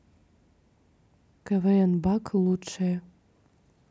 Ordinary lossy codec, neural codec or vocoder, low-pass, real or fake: none; none; none; real